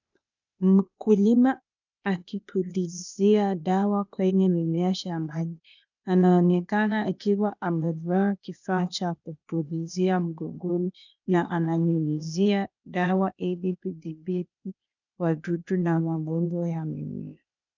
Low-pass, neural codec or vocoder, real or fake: 7.2 kHz; codec, 16 kHz, 0.8 kbps, ZipCodec; fake